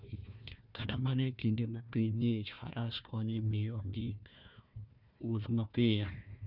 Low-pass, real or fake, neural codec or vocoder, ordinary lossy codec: 5.4 kHz; fake; codec, 16 kHz, 1 kbps, FunCodec, trained on Chinese and English, 50 frames a second; none